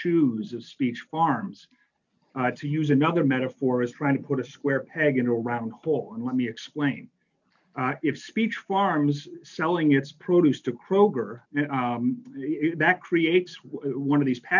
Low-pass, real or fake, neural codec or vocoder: 7.2 kHz; real; none